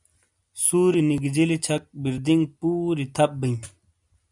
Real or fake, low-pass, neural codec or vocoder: real; 10.8 kHz; none